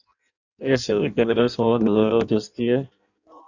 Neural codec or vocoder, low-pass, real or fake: codec, 16 kHz in and 24 kHz out, 0.6 kbps, FireRedTTS-2 codec; 7.2 kHz; fake